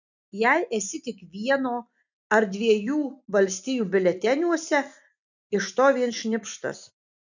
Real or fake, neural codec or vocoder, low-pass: real; none; 7.2 kHz